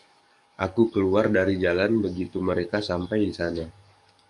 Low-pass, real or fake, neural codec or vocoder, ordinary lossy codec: 10.8 kHz; fake; codec, 44.1 kHz, 7.8 kbps, DAC; MP3, 96 kbps